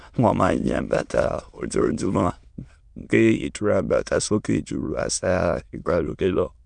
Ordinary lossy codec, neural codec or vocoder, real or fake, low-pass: none; autoencoder, 22.05 kHz, a latent of 192 numbers a frame, VITS, trained on many speakers; fake; 9.9 kHz